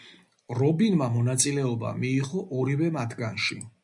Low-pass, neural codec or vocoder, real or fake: 10.8 kHz; none; real